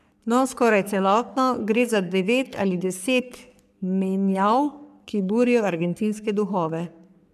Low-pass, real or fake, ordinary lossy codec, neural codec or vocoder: 14.4 kHz; fake; none; codec, 44.1 kHz, 3.4 kbps, Pupu-Codec